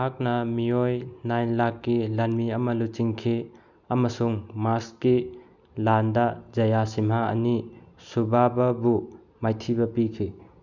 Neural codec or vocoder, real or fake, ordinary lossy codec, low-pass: none; real; none; 7.2 kHz